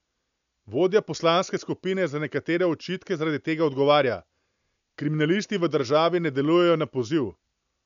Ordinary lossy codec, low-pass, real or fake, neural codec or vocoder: none; 7.2 kHz; real; none